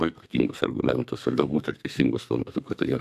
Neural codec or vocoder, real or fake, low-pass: codec, 32 kHz, 1.9 kbps, SNAC; fake; 14.4 kHz